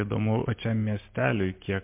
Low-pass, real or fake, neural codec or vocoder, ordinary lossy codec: 3.6 kHz; real; none; MP3, 32 kbps